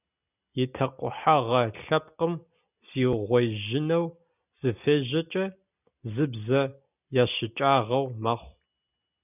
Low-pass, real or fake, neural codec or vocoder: 3.6 kHz; real; none